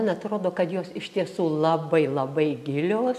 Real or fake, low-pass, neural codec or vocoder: real; 14.4 kHz; none